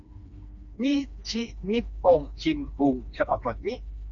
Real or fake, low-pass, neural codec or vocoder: fake; 7.2 kHz; codec, 16 kHz, 2 kbps, FreqCodec, smaller model